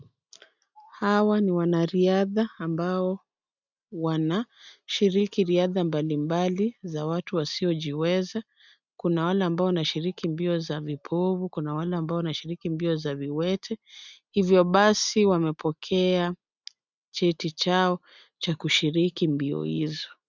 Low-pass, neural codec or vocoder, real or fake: 7.2 kHz; none; real